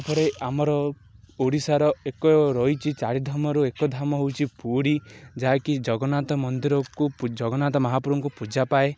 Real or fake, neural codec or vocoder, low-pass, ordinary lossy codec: real; none; none; none